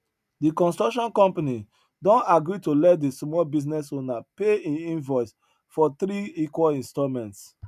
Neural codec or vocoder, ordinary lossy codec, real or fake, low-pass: none; none; real; 14.4 kHz